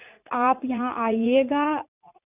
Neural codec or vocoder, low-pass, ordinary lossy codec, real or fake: vocoder, 44.1 kHz, 80 mel bands, Vocos; 3.6 kHz; none; fake